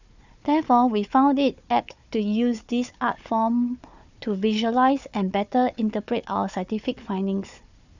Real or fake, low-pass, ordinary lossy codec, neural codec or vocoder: fake; 7.2 kHz; none; codec, 16 kHz, 4 kbps, FunCodec, trained on Chinese and English, 50 frames a second